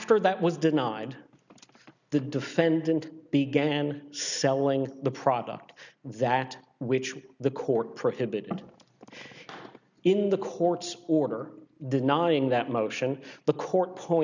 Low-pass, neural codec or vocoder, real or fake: 7.2 kHz; none; real